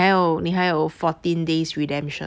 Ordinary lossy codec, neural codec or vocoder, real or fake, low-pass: none; none; real; none